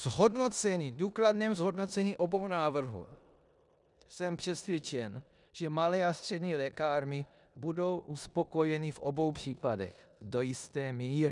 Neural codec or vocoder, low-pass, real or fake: codec, 16 kHz in and 24 kHz out, 0.9 kbps, LongCat-Audio-Codec, four codebook decoder; 10.8 kHz; fake